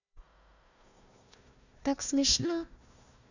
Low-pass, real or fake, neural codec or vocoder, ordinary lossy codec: 7.2 kHz; fake; codec, 16 kHz, 1 kbps, FunCodec, trained on Chinese and English, 50 frames a second; none